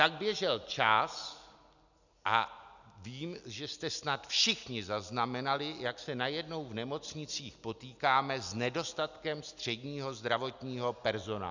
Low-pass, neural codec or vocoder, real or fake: 7.2 kHz; none; real